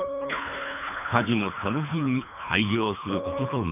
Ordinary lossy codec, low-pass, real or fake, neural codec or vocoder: none; 3.6 kHz; fake; codec, 24 kHz, 3 kbps, HILCodec